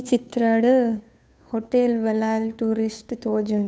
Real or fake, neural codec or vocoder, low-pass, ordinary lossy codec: fake; codec, 16 kHz, 2 kbps, FunCodec, trained on Chinese and English, 25 frames a second; none; none